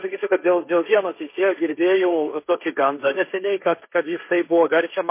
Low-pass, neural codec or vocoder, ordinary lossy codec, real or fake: 3.6 kHz; codec, 16 kHz, 1.1 kbps, Voila-Tokenizer; MP3, 24 kbps; fake